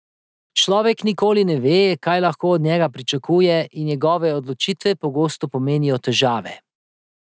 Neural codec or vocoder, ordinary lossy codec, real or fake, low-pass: none; none; real; none